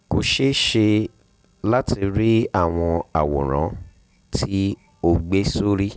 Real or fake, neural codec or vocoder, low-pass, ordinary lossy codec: real; none; none; none